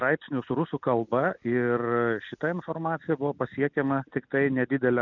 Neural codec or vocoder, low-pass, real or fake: none; 7.2 kHz; real